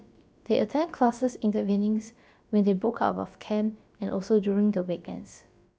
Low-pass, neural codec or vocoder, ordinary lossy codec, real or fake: none; codec, 16 kHz, about 1 kbps, DyCAST, with the encoder's durations; none; fake